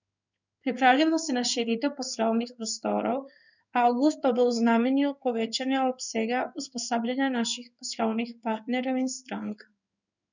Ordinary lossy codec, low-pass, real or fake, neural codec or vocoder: none; 7.2 kHz; fake; codec, 16 kHz in and 24 kHz out, 1 kbps, XY-Tokenizer